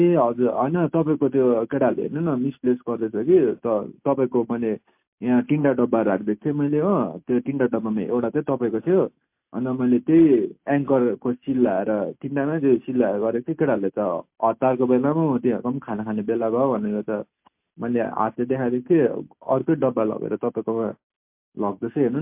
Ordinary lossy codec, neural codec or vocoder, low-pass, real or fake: MP3, 32 kbps; none; 3.6 kHz; real